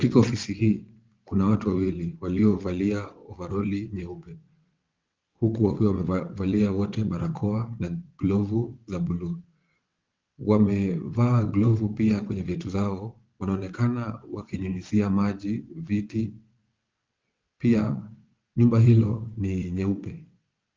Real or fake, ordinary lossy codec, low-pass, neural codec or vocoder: fake; Opus, 32 kbps; 7.2 kHz; vocoder, 22.05 kHz, 80 mel bands, WaveNeXt